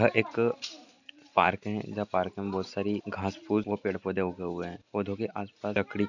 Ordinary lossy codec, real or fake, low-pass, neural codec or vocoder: none; real; 7.2 kHz; none